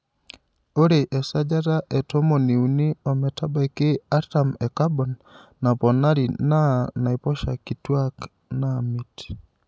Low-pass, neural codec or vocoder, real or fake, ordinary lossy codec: none; none; real; none